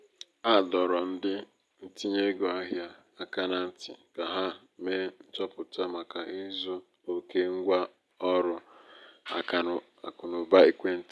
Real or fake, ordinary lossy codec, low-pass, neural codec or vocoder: real; none; none; none